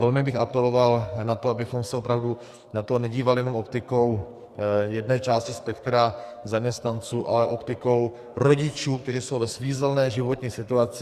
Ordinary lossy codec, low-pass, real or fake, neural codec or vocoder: Opus, 64 kbps; 14.4 kHz; fake; codec, 44.1 kHz, 2.6 kbps, SNAC